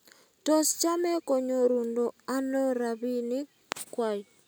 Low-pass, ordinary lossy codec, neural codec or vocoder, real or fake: none; none; none; real